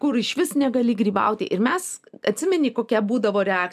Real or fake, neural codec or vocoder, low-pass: real; none; 14.4 kHz